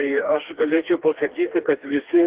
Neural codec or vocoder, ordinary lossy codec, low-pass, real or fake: codec, 16 kHz, 2 kbps, FreqCodec, smaller model; Opus, 16 kbps; 3.6 kHz; fake